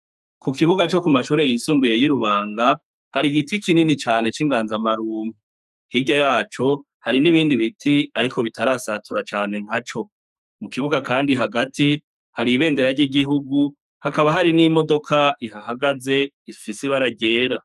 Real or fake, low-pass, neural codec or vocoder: fake; 14.4 kHz; codec, 44.1 kHz, 2.6 kbps, SNAC